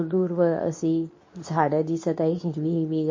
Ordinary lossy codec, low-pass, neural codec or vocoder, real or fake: MP3, 32 kbps; 7.2 kHz; codec, 24 kHz, 0.9 kbps, WavTokenizer, medium speech release version 2; fake